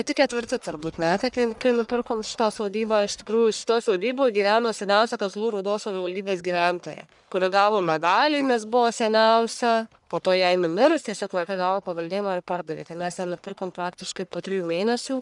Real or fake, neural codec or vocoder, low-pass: fake; codec, 44.1 kHz, 1.7 kbps, Pupu-Codec; 10.8 kHz